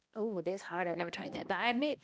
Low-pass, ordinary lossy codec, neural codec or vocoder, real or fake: none; none; codec, 16 kHz, 1 kbps, X-Codec, HuBERT features, trained on balanced general audio; fake